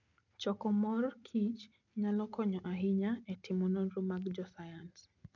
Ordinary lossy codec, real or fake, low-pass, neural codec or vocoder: none; real; 7.2 kHz; none